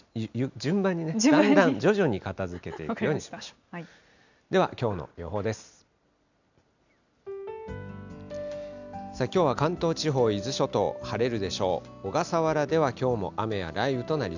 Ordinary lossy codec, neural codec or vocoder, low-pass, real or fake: none; none; 7.2 kHz; real